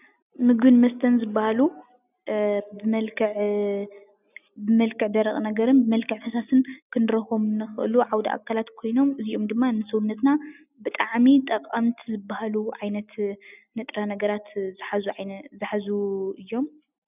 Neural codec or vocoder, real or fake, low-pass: none; real; 3.6 kHz